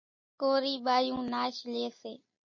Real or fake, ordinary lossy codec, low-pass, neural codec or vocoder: real; MP3, 32 kbps; 7.2 kHz; none